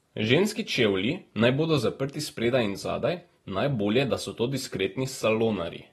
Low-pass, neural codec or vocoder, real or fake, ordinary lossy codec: 19.8 kHz; none; real; AAC, 32 kbps